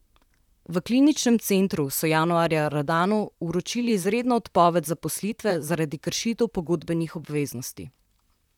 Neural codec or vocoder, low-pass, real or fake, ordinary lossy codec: vocoder, 44.1 kHz, 128 mel bands, Pupu-Vocoder; 19.8 kHz; fake; none